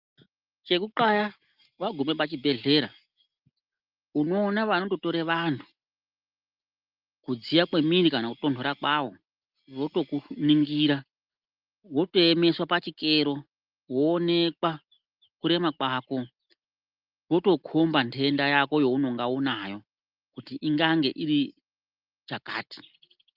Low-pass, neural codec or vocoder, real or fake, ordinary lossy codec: 5.4 kHz; none; real; Opus, 32 kbps